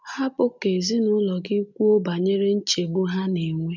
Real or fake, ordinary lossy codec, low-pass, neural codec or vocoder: real; none; 7.2 kHz; none